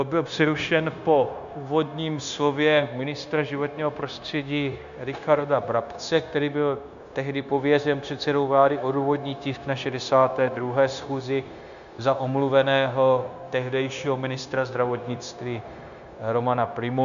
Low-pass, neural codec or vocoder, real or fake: 7.2 kHz; codec, 16 kHz, 0.9 kbps, LongCat-Audio-Codec; fake